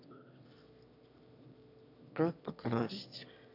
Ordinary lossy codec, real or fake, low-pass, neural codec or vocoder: none; fake; 5.4 kHz; autoencoder, 22.05 kHz, a latent of 192 numbers a frame, VITS, trained on one speaker